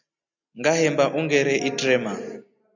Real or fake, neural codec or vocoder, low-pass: real; none; 7.2 kHz